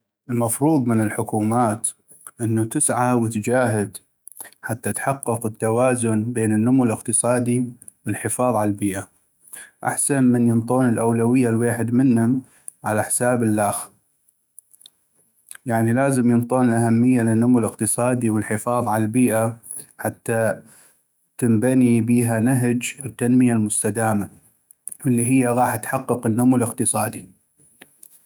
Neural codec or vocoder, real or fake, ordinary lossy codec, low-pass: autoencoder, 48 kHz, 128 numbers a frame, DAC-VAE, trained on Japanese speech; fake; none; none